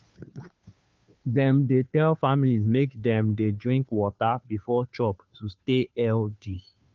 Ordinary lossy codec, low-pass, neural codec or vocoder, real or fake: Opus, 24 kbps; 7.2 kHz; codec, 16 kHz, 2 kbps, FunCodec, trained on Chinese and English, 25 frames a second; fake